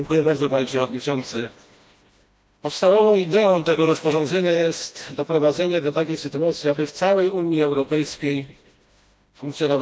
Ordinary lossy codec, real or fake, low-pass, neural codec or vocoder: none; fake; none; codec, 16 kHz, 1 kbps, FreqCodec, smaller model